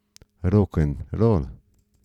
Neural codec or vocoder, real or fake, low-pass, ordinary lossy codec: none; real; 19.8 kHz; none